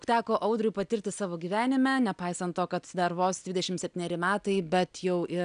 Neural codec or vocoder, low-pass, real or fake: none; 9.9 kHz; real